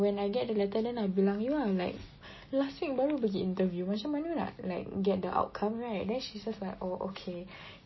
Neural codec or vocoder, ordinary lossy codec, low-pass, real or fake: none; MP3, 24 kbps; 7.2 kHz; real